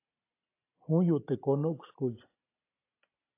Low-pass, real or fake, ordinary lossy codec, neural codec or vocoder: 3.6 kHz; real; AAC, 24 kbps; none